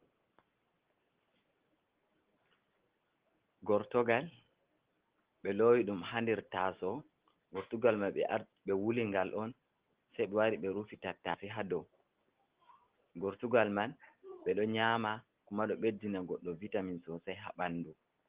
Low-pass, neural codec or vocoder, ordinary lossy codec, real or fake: 3.6 kHz; none; Opus, 16 kbps; real